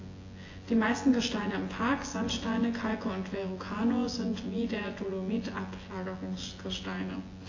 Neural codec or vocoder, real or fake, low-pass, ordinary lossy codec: vocoder, 24 kHz, 100 mel bands, Vocos; fake; 7.2 kHz; AAC, 32 kbps